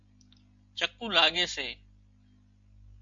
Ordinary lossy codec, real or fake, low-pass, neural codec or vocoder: MP3, 48 kbps; real; 7.2 kHz; none